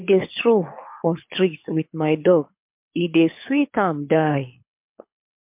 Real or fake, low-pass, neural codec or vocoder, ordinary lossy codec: fake; 3.6 kHz; codec, 24 kHz, 6 kbps, HILCodec; MP3, 32 kbps